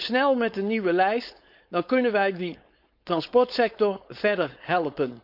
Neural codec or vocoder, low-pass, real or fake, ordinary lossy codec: codec, 16 kHz, 4.8 kbps, FACodec; 5.4 kHz; fake; none